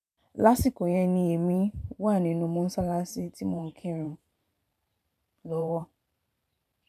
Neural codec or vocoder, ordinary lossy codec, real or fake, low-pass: vocoder, 44.1 kHz, 128 mel bands every 512 samples, BigVGAN v2; none; fake; 14.4 kHz